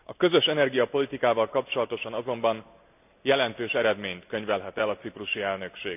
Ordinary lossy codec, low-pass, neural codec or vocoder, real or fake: none; 3.6 kHz; none; real